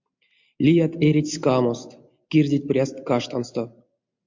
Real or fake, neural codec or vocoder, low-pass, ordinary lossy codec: real; none; 7.2 kHz; MP3, 48 kbps